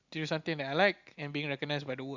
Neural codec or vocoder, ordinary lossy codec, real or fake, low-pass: none; Opus, 64 kbps; real; 7.2 kHz